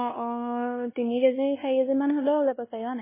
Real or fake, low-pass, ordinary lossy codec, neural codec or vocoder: fake; 3.6 kHz; MP3, 16 kbps; codec, 16 kHz, 1 kbps, X-Codec, WavLM features, trained on Multilingual LibriSpeech